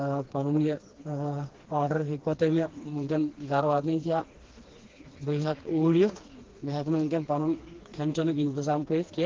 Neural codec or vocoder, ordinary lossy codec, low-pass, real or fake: codec, 16 kHz, 2 kbps, FreqCodec, smaller model; Opus, 16 kbps; 7.2 kHz; fake